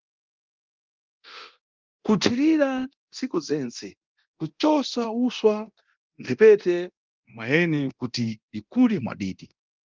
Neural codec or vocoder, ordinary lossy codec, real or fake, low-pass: codec, 24 kHz, 0.9 kbps, DualCodec; Opus, 32 kbps; fake; 7.2 kHz